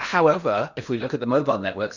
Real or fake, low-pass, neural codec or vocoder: fake; 7.2 kHz; codec, 16 kHz in and 24 kHz out, 0.8 kbps, FocalCodec, streaming, 65536 codes